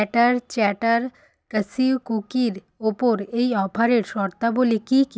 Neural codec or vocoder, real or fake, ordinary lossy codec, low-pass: none; real; none; none